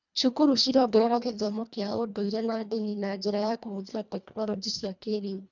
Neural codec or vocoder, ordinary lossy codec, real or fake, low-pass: codec, 24 kHz, 1.5 kbps, HILCodec; none; fake; 7.2 kHz